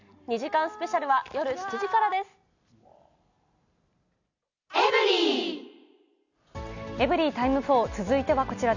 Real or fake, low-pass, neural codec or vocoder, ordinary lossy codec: real; 7.2 kHz; none; none